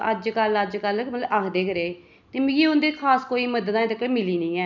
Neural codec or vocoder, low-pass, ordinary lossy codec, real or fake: none; 7.2 kHz; none; real